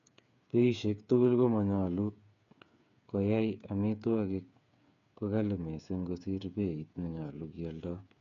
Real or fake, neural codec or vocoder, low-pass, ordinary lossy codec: fake; codec, 16 kHz, 8 kbps, FreqCodec, smaller model; 7.2 kHz; AAC, 64 kbps